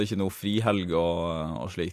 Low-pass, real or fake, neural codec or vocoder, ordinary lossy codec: 14.4 kHz; real; none; AAC, 64 kbps